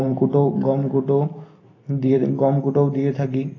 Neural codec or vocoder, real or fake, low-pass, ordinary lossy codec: none; real; 7.2 kHz; AAC, 32 kbps